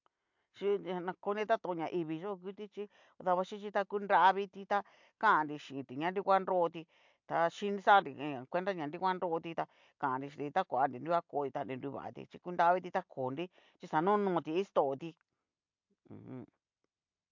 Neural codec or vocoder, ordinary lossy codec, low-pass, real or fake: none; MP3, 64 kbps; 7.2 kHz; real